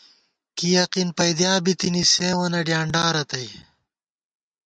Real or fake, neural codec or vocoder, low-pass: real; none; 9.9 kHz